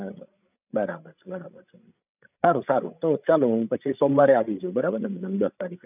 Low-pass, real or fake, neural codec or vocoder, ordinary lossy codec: 3.6 kHz; fake; codec, 16 kHz, 16 kbps, FreqCodec, larger model; none